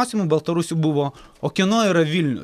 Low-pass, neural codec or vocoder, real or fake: 14.4 kHz; none; real